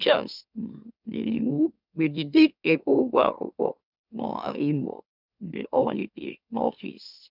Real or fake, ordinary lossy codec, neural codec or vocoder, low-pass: fake; none; autoencoder, 44.1 kHz, a latent of 192 numbers a frame, MeloTTS; 5.4 kHz